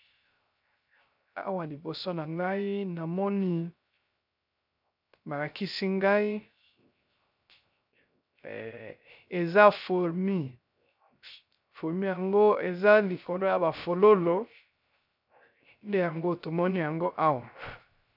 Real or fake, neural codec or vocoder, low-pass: fake; codec, 16 kHz, 0.3 kbps, FocalCodec; 5.4 kHz